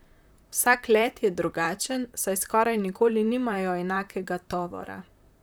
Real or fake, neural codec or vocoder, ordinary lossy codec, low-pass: fake; vocoder, 44.1 kHz, 128 mel bands, Pupu-Vocoder; none; none